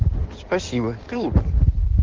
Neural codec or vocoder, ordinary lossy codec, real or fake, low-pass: codec, 16 kHz in and 24 kHz out, 2.2 kbps, FireRedTTS-2 codec; Opus, 16 kbps; fake; 7.2 kHz